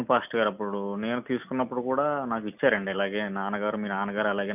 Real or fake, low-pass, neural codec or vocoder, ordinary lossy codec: real; 3.6 kHz; none; none